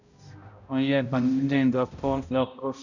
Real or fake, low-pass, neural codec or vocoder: fake; 7.2 kHz; codec, 16 kHz, 0.5 kbps, X-Codec, HuBERT features, trained on balanced general audio